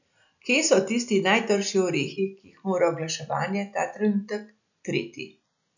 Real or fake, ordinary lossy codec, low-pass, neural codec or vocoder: real; none; 7.2 kHz; none